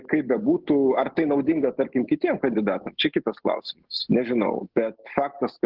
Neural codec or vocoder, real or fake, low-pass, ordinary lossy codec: none; real; 5.4 kHz; Opus, 16 kbps